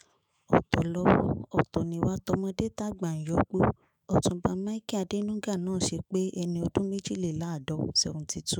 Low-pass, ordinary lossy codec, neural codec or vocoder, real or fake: none; none; autoencoder, 48 kHz, 128 numbers a frame, DAC-VAE, trained on Japanese speech; fake